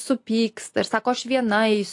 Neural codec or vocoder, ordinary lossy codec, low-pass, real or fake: none; AAC, 48 kbps; 10.8 kHz; real